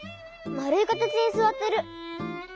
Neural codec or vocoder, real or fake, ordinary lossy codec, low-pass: none; real; none; none